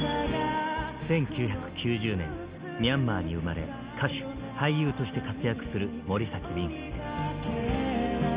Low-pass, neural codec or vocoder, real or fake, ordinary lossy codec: 3.6 kHz; none; real; Opus, 32 kbps